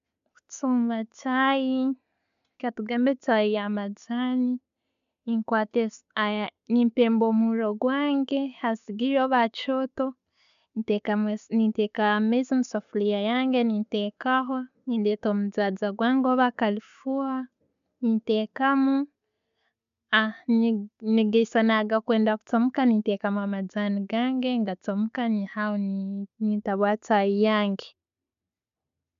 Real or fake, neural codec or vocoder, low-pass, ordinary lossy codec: real; none; 7.2 kHz; none